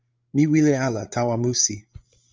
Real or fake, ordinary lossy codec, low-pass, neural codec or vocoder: fake; Opus, 24 kbps; 7.2 kHz; codec, 16 kHz, 16 kbps, FreqCodec, larger model